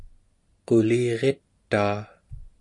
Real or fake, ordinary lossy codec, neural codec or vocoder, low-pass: real; MP3, 64 kbps; none; 10.8 kHz